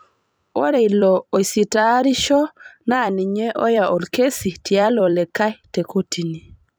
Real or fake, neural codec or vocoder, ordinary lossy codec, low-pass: fake; vocoder, 44.1 kHz, 128 mel bands every 256 samples, BigVGAN v2; none; none